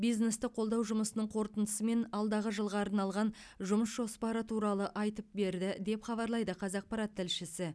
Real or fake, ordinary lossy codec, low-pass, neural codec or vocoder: real; none; none; none